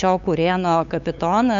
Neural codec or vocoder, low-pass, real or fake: codec, 16 kHz, 8 kbps, FunCodec, trained on LibriTTS, 25 frames a second; 7.2 kHz; fake